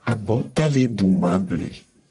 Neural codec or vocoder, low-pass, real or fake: codec, 44.1 kHz, 1.7 kbps, Pupu-Codec; 10.8 kHz; fake